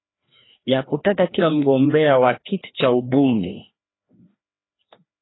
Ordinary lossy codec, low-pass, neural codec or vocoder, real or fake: AAC, 16 kbps; 7.2 kHz; codec, 16 kHz, 1 kbps, FreqCodec, larger model; fake